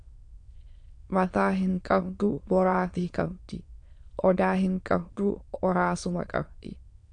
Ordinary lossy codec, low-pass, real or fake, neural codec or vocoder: AAC, 64 kbps; 9.9 kHz; fake; autoencoder, 22.05 kHz, a latent of 192 numbers a frame, VITS, trained on many speakers